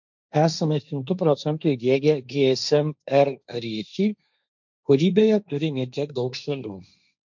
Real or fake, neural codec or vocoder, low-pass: fake; codec, 16 kHz, 1.1 kbps, Voila-Tokenizer; 7.2 kHz